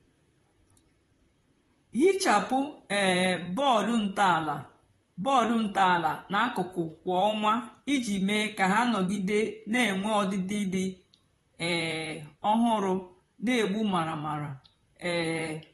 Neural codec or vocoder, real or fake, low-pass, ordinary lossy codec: vocoder, 44.1 kHz, 128 mel bands, Pupu-Vocoder; fake; 19.8 kHz; AAC, 32 kbps